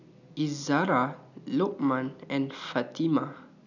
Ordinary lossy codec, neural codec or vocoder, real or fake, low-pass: none; none; real; 7.2 kHz